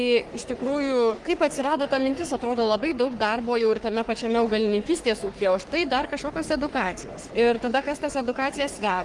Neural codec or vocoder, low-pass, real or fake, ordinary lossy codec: codec, 44.1 kHz, 3.4 kbps, Pupu-Codec; 10.8 kHz; fake; Opus, 24 kbps